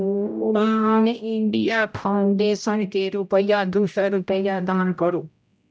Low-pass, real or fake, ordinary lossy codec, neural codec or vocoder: none; fake; none; codec, 16 kHz, 0.5 kbps, X-Codec, HuBERT features, trained on general audio